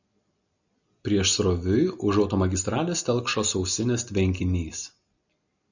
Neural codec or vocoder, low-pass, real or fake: none; 7.2 kHz; real